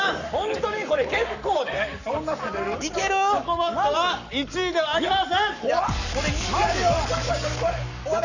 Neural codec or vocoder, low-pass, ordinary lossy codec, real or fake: codec, 44.1 kHz, 7.8 kbps, Pupu-Codec; 7.2 kHz; none; fake